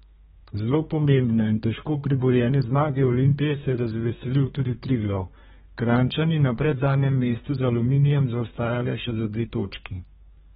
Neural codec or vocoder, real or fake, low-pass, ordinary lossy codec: codec, 32 kHz, 1.9 kbps, SNAC; fake; 14.4 kHz; AAC, 16 kbps